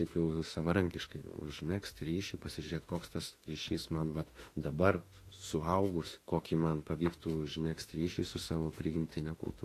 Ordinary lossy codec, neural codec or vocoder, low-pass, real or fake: AAC, 48 kbps; autoencoder, 48 kHz, 32 numbers a frame, DAC-VAE, trained on Japanese speech; 14.4 kHz; fake